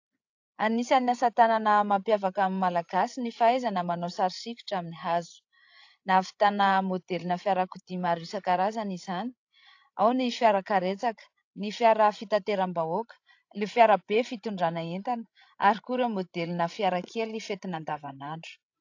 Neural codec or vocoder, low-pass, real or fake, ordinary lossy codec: codec, 16 kHz, 16 kbps, FreqCodec, larger model; 7.2 kHz; fake; AAC, 48 kbps